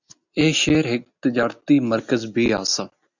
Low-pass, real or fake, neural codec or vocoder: 7.2 kHz; real; none